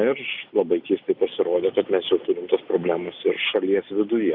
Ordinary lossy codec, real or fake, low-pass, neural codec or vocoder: Opus, 64 kbps; real; 5.4 kHz; none